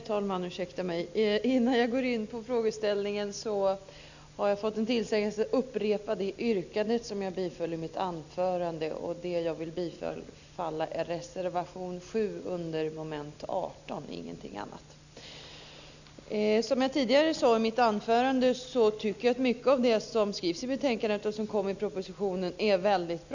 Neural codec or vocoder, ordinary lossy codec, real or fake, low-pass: none; none; real; 7.2 kHz